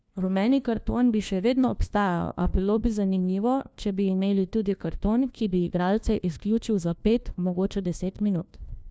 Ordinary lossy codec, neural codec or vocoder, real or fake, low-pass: none; codec, 16 kHz, 1 kbps, FunCodec, trained on LibriTTS, 50 frames a second; fake; none